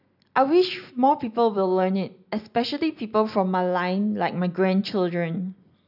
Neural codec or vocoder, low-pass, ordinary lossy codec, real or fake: none; 5.4 kHz; none; real